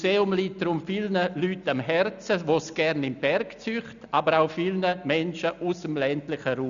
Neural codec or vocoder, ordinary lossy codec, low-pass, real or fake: none; none; 7.2 kHz; real